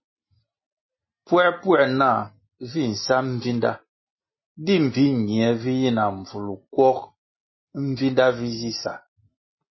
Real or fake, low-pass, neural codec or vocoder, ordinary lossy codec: real; 7.2 kHz; none; MP3, 24 kbps